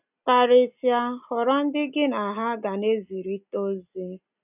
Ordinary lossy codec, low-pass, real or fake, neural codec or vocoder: none; 3.6 kHz; real; none